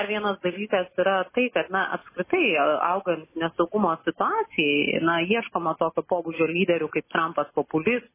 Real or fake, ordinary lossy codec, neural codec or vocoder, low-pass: real; MP3, 16 kbps; none; 3.6 kHz